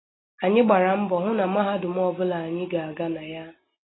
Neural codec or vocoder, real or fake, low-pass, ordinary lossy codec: none; real; 7.2 kHz; AAC, 16 kbps